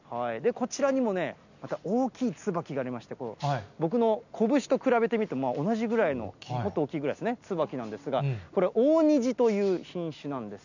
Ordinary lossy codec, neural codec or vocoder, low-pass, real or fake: none; none; 7.2 kHz; real